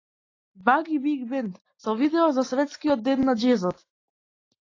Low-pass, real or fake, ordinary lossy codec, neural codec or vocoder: 7.2 kHz; real; AAC, 32 kbps; none